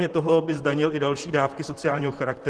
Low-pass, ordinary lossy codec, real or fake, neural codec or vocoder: 10.8 kHz; Opus, 16 kbps; fake; vocoder, 44.1 kHz, 128 mel bands, Pupu-Vocoder